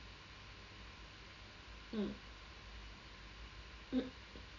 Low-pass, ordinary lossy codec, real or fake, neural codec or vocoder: 7.2 kHz; none; real; none